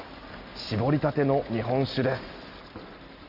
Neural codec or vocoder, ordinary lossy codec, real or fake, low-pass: none; none; real; 5.4 kHz